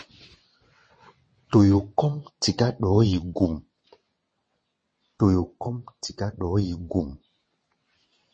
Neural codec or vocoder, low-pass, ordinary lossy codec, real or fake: vocoder, 22.05 kHz, 80 mel bands, WaveNeXt; 9.9 kHz; MP3, 32 kbps; fake